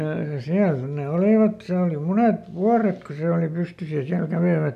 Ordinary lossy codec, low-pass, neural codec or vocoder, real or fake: none; 14.4 kHz; none; real